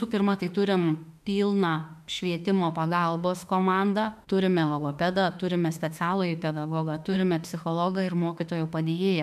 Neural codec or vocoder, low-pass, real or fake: autoencoder, 48 kHz, 32 numbers a frame, DAC-VAE, trained on Japanese speech; 14.4 kHz; fake